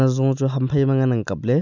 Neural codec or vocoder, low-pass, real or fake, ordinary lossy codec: none; 7.2 kHz; real; none